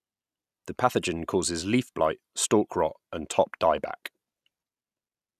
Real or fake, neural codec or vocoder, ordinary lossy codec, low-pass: real; none; none; 14.4 kHz